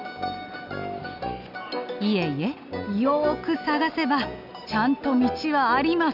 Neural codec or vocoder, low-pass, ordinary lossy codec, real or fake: none; 5.4 kHz; none; real